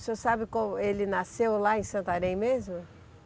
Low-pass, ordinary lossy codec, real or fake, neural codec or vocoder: none; none; real; none